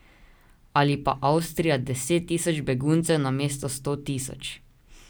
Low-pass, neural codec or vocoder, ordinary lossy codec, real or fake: none; none; none; real